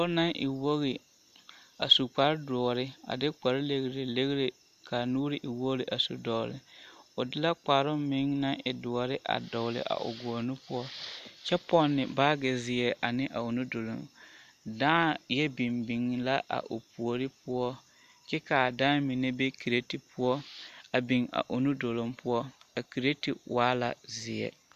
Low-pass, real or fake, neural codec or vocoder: 14.4 kHz; real; none